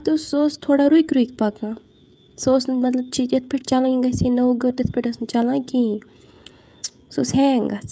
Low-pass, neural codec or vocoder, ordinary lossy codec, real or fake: none; codec, 16 kHz, 16 kbps, FreqCodec, smaller model; none; fake